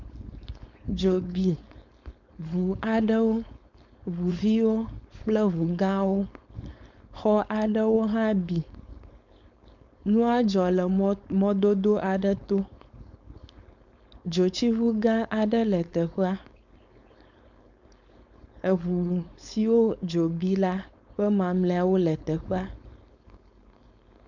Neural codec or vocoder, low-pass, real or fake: codec, 16 kHz, 4.8 kbps, FACodec; 7.2 kHz; fake